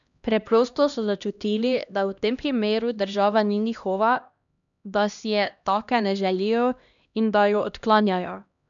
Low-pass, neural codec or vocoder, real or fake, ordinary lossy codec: 7.2 kHz; codec, 16 kHz, 1 kbps, X-Codec, HuBERT features, trained on LibriSpeech; fake; none